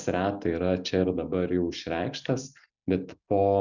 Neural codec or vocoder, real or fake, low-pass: none; real; 7.2 kHz